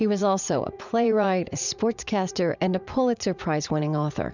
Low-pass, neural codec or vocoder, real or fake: 7.2 kHz; vocoder, 44.1 kHz, 80 mel bands, Vocos; fake